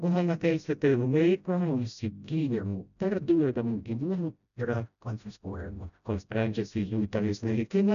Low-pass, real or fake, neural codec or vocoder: 7.2 kHz; fake; codec, 16 kHz, 0.5 kbps, FreqCodec, smaller model